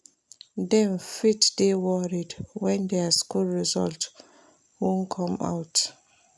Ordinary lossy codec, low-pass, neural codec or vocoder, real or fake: none; none; none; real